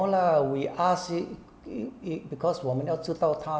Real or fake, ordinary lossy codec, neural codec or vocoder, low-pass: real; none; none; none